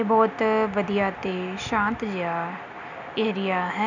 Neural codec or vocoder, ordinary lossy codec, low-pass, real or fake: none; none; 7.2 kHz; real